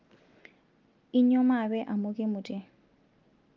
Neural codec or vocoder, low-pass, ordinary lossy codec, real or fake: none; 7.2 kHz; Opus, 32 kbps; real